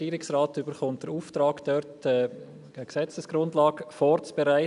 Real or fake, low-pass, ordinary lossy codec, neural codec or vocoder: real; 10.8 kHz; none; none